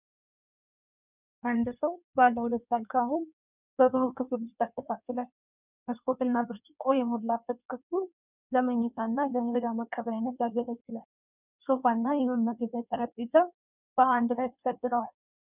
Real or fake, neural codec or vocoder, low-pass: fake; codec, 16 kHz in and 24 kHz out, 1.1 kbps, FireRedTTS-2 codec; 3.6 kHz